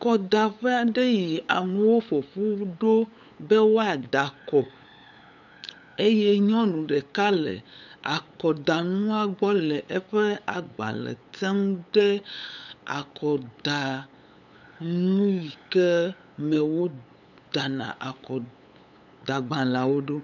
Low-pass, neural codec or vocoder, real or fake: 7.2 kHz; codec, 16 kHz, 8 kbps, FunCodec, trained on LibriTTS, 25 frames a second; fake